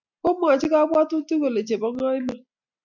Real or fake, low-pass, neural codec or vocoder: real; 7.2 kHz; none